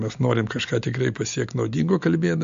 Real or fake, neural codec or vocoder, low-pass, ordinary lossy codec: real; none; 7.2 kHz; MP3, 48 kbps